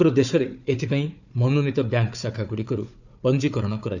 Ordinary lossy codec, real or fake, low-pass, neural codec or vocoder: none; fake; 7.2 kHz; codec, 16 kHz, 4 kbps, FunCodec, trained on Chinese and English, 50 frames a second